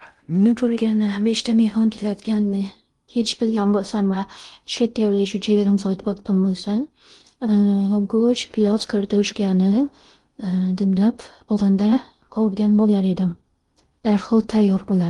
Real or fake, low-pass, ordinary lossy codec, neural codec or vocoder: fake; 10.8 kHz; Opus, 24 kbps; codec, 16 kHz in and 24 kHz out, 0.6 kbps, FocalCodec, streaming, 2048 codes